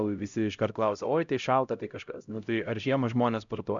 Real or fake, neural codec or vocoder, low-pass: fake; codec, 16 kHz, 0.5 kbps, X-Codec, HuBERT features, trained on LibriSpeech; 7.2 kHz